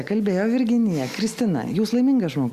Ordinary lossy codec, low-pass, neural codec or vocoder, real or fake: Opus, 64 kbps; 14.4 kHz; none; real